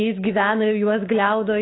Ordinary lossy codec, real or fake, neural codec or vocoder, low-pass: AAC, 16 kbps; fake; codec, 16 kHz, 6 kbps, DAC; 7.2 kHz